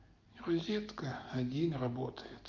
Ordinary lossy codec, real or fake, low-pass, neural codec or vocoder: Opus, 24 kbps; real; 7.2 kHz; none